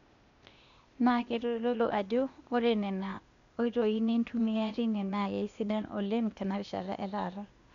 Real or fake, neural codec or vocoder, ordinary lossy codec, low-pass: fake; codec, 16 kHz, 0.8 kbps, ZipCodec; MP3, 64 kbps; 7.2 kHz